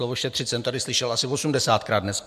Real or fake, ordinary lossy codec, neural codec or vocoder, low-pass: real; MP3, 96 kbps; none; 14.4 kHz